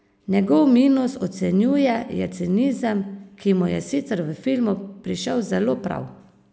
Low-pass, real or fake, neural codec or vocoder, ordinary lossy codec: none; real; none; none